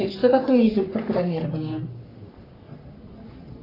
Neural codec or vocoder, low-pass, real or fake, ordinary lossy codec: codec, 44.1 kHz, 3.4 kbps, Pupu-Codec; 5.4 kHz; fake; AAC, 24 kbps